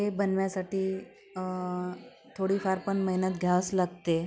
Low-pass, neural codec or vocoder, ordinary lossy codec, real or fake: none; none; none; real